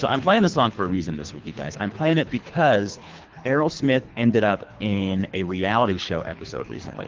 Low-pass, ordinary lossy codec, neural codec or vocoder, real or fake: 7.2 kHz; Opus, 32 kbps; codec, 24 kHz, 3 kbps, HILCodec; fake